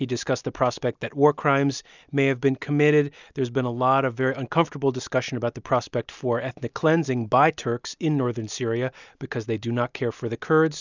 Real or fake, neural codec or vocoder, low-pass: real; none; 7.2 kHz